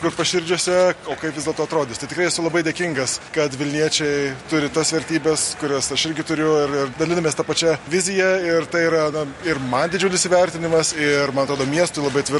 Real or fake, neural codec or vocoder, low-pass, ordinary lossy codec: real; none; 14.4 kHz; MP3, 48 kbps